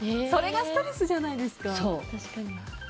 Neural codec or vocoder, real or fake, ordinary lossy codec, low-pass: none; real; none; none